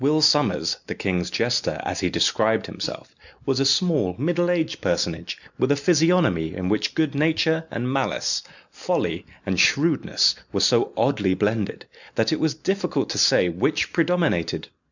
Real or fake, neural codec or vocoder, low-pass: real; none; 7.2 kHz